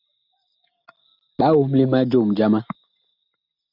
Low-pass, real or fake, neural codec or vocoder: 5.4 kHz; real; none